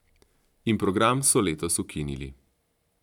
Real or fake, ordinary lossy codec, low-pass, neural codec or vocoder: fake; none; 19.8 kHz; vocoder, 44.1 kHz, 128 mel bands every 512 samples, BigVGAN v2